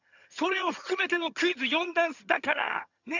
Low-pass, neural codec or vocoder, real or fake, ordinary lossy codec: 7.2 kHz; vocoder, 22.05 kHz, 80 mel bands, HiFi-GAN; fake; none